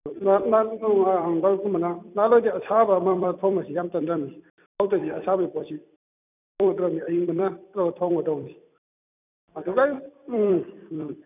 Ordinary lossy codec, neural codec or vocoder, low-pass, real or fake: none; vocoder, 44.1 kHz, 128 mel bands every 256 samples, BigVGAN v2; 3.6 kHz; fake